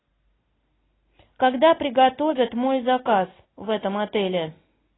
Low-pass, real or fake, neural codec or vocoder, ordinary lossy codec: 7.2 kHz; real; none; AAC, 16 kbps